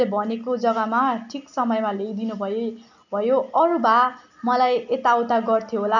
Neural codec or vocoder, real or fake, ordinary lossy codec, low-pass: none; real; none; 7.2 kHz